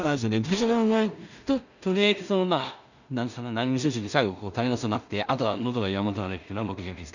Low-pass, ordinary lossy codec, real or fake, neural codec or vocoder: 7.2 kHz; none; fake; codec, 16 kHz in and 24 kHz out, 0.4 kbps, LongCat-Audio-Codec, two codebook decoder